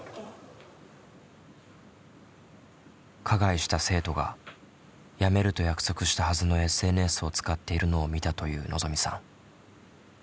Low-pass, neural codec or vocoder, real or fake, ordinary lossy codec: none; none; real; none